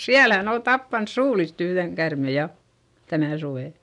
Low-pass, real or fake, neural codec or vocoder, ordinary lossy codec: 10.8 kHz; real; none; none